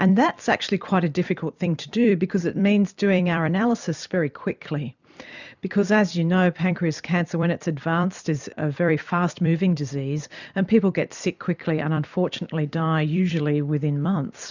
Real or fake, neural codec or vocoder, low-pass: fake; vocoder, 44.1 kHz, 128 mel bands every 256 samples, BigVGAN v2; 7.2 kHz